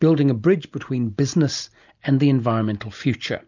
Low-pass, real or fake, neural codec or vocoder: 7.2 kHz; real; none